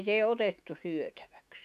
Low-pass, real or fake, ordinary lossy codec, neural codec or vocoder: 14.4 kHz; fake; none; autoencoder, 48 kHz, 128 numbers a frame, DAC-VAE, trained on Japanese speech